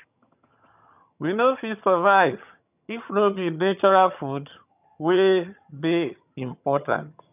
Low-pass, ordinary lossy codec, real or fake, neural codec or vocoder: 3.6 kHz; none; fake; vocoder, 22.05 kHz, 80 mel bands, HiFi-GAN